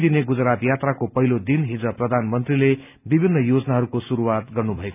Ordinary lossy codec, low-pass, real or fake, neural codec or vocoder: none; 3.6 kHz; real; none